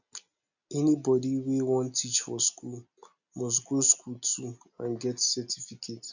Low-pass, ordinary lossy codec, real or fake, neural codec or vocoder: 7.2 kHz; none; real; none